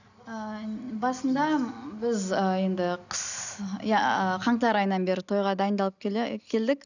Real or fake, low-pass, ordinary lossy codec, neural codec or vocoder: real; 7.2 kHz; none; none